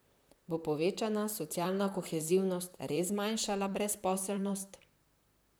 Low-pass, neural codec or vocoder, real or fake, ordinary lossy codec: none; vocoder, 44.1 kHz, 128 mel bands, Pupu-Vocoder; fake; none